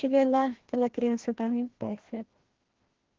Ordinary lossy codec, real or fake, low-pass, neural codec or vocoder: Opus, 16 kbps; fake; 7.2 kHz; codec, 16 kHz, 1 kbps, FreqCodec, larger model